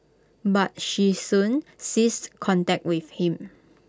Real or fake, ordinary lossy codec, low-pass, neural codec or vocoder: real; none; none; none